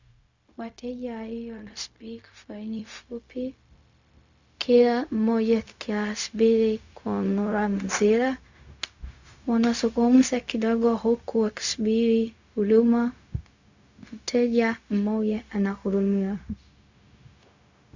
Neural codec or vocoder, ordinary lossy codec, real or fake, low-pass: codec, 16 kHz, 0.4 kbps, LongCat-Audio-Codec; Opus, 64 kbps; fake; 7.2 kHz